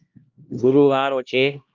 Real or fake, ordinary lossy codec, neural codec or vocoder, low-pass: fake; Opus, 32 kbps; codec, 16 kHz, 1 kbps, X-Codec, HuBERT features, trained on LibriSpeech; 7.2 kHz